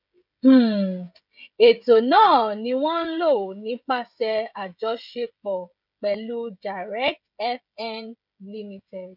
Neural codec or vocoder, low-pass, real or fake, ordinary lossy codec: codec, 16 kHz, 16 kbps, FreqCodec, smaller model; 5.4 kHz; fake; none